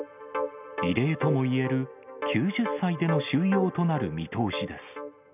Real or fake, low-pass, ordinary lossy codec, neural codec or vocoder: real; 3.6 kHz; none; none